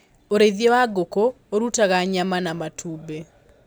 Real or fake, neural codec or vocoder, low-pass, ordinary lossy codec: real; none; none; none